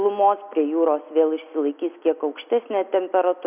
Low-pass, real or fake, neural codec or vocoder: 3.6 kHz; real; none